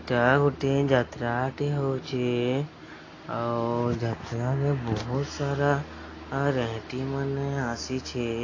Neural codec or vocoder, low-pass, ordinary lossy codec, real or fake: none; 7.2 kHz; AAC, 32 kbps; real